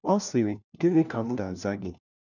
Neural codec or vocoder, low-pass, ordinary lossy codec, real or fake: codec, 16 kHz, 1 kbps, FunCodec, trained on LibriTTS, 50 frames a second; 7.2 kHz; none; fake